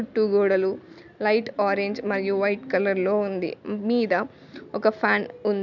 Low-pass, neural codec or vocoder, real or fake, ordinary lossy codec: 7.2 kHz; none; real; none